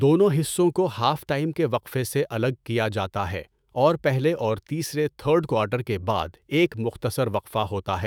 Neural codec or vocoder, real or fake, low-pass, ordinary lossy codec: autoencoder, 48 kHz, 128 numbers a frame, DAC-VAE, trained on Japanese speech; fake; none; none